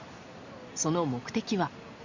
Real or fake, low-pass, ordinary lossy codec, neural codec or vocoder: real; 7.2 kHz; Opus, 64 kbps; none